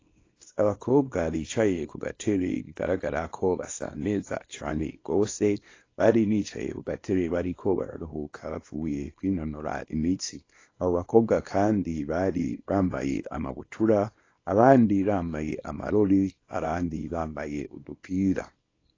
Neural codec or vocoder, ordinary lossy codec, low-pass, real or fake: codec, 24 kHz, 0.9 kbps, WavTokenizer, small release; AAC, 32 kbps; 7.2 kHz; fake